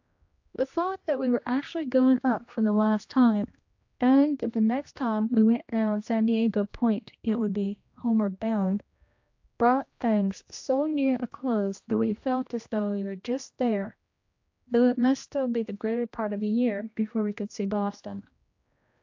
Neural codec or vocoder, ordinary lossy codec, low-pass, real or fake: codec, 16 kHz, 1 kbps, X-Codec, HuBERT features, trained on general audio; AAC, 48 kbps; 7.2 kHz; fake